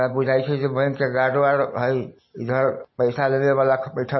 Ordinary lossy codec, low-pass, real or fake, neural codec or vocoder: MP3, 24 kbps; 7.2 kHz; real; none